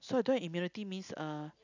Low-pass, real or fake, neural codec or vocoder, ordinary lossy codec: 7.2 kHz; real; none; none